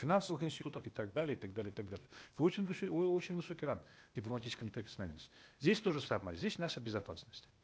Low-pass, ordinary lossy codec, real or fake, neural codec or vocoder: none; none; fake; codec, 16 kHz, 0.8 kbps, ZipCodec